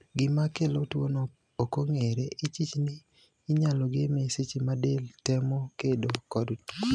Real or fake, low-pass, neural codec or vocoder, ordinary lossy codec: real; none; none; none